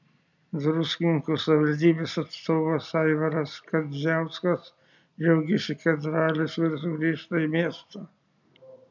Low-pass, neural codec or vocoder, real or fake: 7.2 kHz; none; real